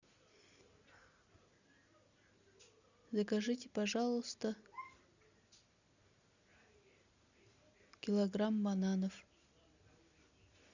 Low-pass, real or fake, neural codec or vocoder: 7.2 kHz; real; none